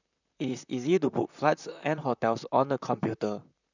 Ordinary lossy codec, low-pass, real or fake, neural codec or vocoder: none; 7.2 kHz; fake; vocoder, 44.1 kHz, 128 mel bands, Pupu-Vocoder